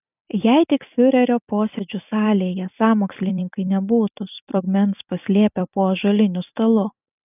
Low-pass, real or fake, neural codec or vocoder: 3.6 kHz; fake; vocoder, 44.1 kHz, 128 mel bands every 256 samples, BigVGAN v2